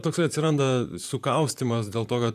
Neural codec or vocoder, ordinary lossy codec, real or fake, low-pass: vocoder, 44.1 kHz, 128 mel bands, Pupu-Vocoder; AAC, 96 kbps; fake; 14.4 kHz